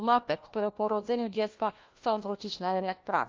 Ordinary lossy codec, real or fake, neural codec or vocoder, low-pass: Opus, 24 kbps; fake; codec, 16 kHz, 1 kbps, FunCodec, trained on LibriTTS, 50 frames a second; 7.2 kHz